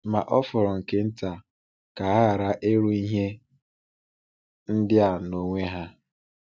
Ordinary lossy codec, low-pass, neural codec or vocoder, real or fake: none; none; none; real